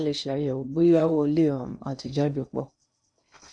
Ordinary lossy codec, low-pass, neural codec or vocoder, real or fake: none; 9.9 kHz; codec, 16 kHz in and 24 kHz out, 0.8 kbps, FocalCodec, streaming, 65536 codes; fake